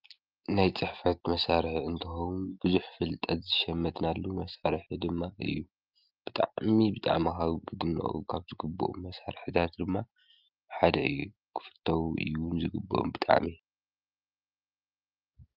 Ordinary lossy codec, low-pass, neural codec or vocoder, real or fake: Opus, 24 kbps; 5.4 kHz; none; real